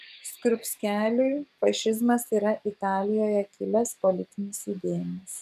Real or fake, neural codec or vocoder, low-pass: fake; codec, 44.1 kHz, 7.8 kbps, DAC; 14.4 kHz